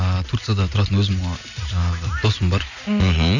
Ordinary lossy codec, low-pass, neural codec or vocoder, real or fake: none; 7.2 kHz; none; real